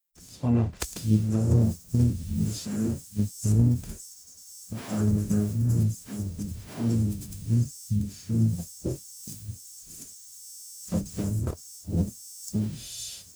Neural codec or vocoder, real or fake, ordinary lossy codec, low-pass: codec, 44.1 kHz, 0.9 kbps, DAC; fake; none; none